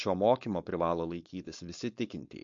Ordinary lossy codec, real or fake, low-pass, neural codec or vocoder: MP3, 48 kbps; fake; 7.2 kHz; codec, 16 kHz, 4.8 kbps, FACodec